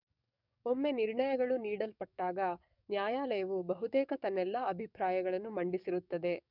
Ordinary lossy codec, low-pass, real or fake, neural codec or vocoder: none; 5.4 kHz; fake; codec, 44.1 kHz, 7.8 kbps, DAC